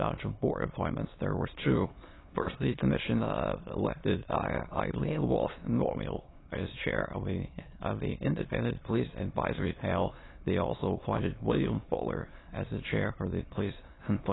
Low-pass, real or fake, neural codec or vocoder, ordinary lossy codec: 7.2 kHz; fake; autoencoder, 22.05 kHz, a latent of 192 numbers a frame, VITS, trained on many speakers; AAC, 16 kbps